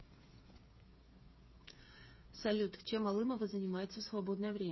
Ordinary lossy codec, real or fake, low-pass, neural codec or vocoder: MP3, 24 kbps; fake; 7.2 kHz; codec, 16 kHz, 8 kbps, FreqCodec, smaller model